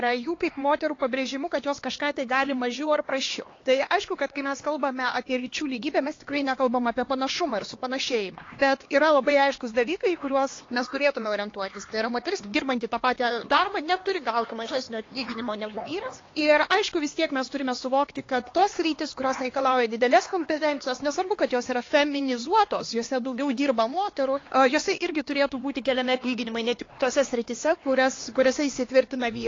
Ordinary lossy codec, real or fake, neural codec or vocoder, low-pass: AAC, 32 kbps; fake; codec, 16 kHz, 2 kbps, X-Codec, HuBERT features, trained on LibriSpeech; 7.2 kHz